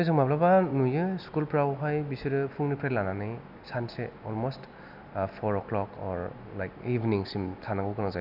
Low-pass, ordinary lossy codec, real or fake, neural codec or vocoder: 5.4 kHz; none; real; none